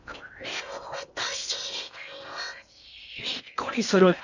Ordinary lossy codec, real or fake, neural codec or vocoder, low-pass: none; fake; codec, 16 kHz in and 24 kHz out, 0.6 kbps, FocalCodec, streaming, 2048 codes; 7.2 kHz